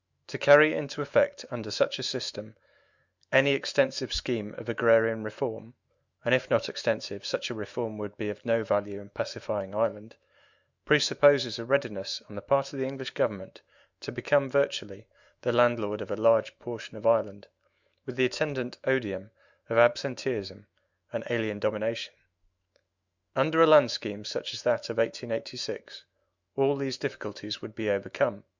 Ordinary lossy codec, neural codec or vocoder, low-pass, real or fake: Opus, 64 kbps; autoencoder, 48 kHz, 128 numbers a frame, DAC-VAE, trained on Japanese speech; 7.2 kHz; fake